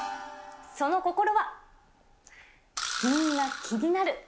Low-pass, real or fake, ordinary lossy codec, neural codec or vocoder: none; real; none; none